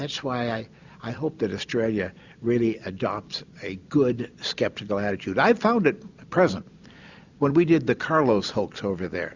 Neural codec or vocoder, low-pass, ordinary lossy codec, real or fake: none; 7.2 kHz; Opus, 64 kbps; real